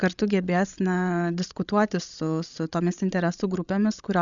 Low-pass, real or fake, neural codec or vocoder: 7.2 kHz; fake; codec, 16 kHz, 16 kbps, FreqCodec, larger model